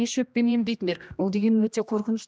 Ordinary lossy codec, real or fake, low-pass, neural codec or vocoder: none; fake; none; codec, 16 kHz, 1 kbps, X-Codec, HuBERT features, trained on general audio